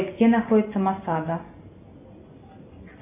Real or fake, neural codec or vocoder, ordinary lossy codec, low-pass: real; none; MP3, 24 kbps; 3.6 kHz